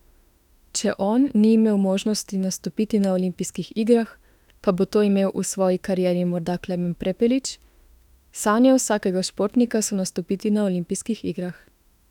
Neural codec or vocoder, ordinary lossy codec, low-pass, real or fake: autoencoder, 48 kHz, 32 numbers a frame, DAC-VAE, trained on Japanese speech; none; 19.8 kHz; fake